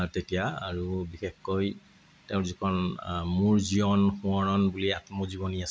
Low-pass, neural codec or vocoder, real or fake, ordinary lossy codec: none; none; real; none